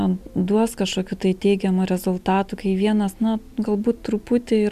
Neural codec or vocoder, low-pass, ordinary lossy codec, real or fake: none; 14.4 kHz; MP3, 96 kbps; real